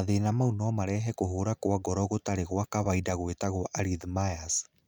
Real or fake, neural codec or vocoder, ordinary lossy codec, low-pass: real; none; none; none